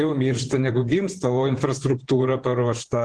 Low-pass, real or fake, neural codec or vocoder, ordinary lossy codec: 10.8 kHz; fake; vocoder, 24 kHz, 100 mel bands, Vocos; Opus, 16 kbps